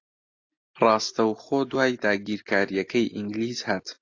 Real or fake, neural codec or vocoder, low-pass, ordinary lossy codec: real; none; 7.2 kHz; AAC, 48 kbps